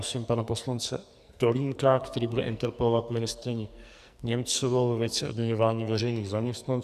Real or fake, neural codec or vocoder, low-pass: fake; codec, 44.1 kHz, 2.6 kbps, SNAC; 14.4 kHz